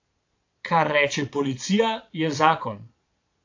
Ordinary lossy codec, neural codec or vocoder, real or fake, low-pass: AAC, 48 kbps; vocoder, 22.05 kHz, 80 mel bands, Vocos; fake; 7.2 kHz